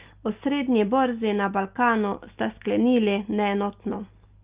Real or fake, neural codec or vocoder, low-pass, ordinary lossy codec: real; none; 3.6 kHz; Opus, 32 kbps